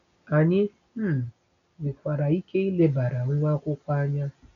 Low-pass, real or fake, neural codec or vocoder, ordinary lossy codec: 7.2 kHz; real; none; none